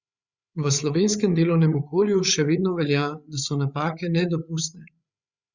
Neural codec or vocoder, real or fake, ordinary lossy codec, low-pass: codec, 16 kHz, 8 kbps, FreqCodec, larger model; fake; Opus, 64 kbps; 7.2 kHz